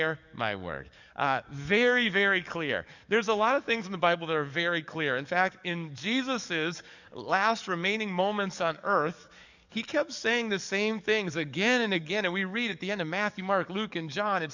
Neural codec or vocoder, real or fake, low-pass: codec, 44.1 kHz, 7.8 kbps, DAC; fake; 7.2 kHz